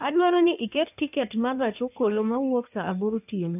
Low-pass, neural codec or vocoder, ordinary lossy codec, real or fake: 3.6 kHz; codec, 16 kHz in and 24 kHz out, 1.1 kbps, FireRedTTS-2 codec; none; fake